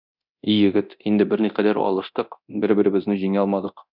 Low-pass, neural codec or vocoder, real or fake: 5.4 kHz; codec, 24 kHz, 0.9 kbps, DualCodec; fake